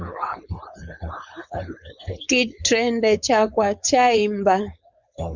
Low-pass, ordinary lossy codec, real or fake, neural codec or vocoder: 7.2 kHz; Opus, 64 kbps; fake; codec, 16 kHz, 4.8 kbps, FACodec